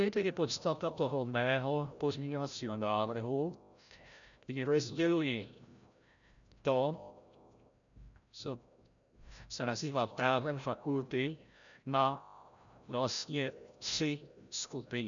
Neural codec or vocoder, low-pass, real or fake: codec, 16 kHz, 0.5 kbps, FreqCodec, larger model; 7.2 kHz; fake